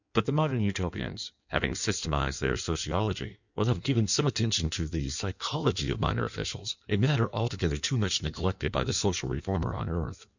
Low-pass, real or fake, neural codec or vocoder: 7.2 kHz; fake; codec, 16 kHz in and 24 kHz out, 1.1 kbps, FireRedTTS-2 codec